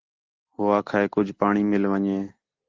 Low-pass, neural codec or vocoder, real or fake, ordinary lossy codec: 7.2 kHz; none; real; Opus, 16 kbps